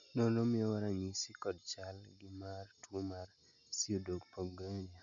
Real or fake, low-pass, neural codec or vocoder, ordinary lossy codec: real; 7.2 kHz; none; none